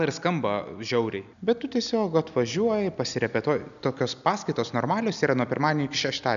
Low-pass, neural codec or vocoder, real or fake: 7.2 kHz; none; real